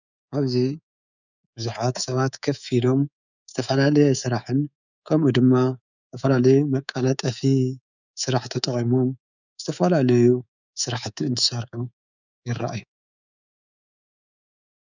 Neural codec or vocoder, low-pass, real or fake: codec, 24 kHz, 3.1 kbps, DualCodec; 7.2 kHz; fake